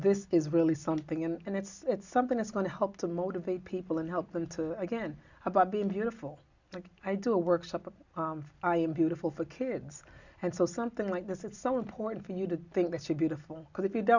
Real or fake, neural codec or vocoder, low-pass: real; none; 7.2 kHz